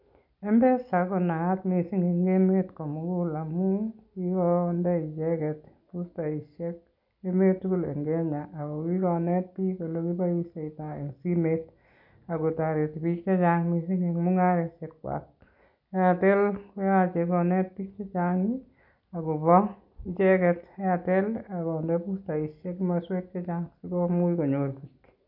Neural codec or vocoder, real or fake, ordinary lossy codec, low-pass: none; real; none; 5.4 kHz